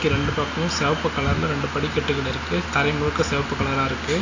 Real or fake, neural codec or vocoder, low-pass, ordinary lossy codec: real; none; 7.2 kHz; AAC, 32 kbps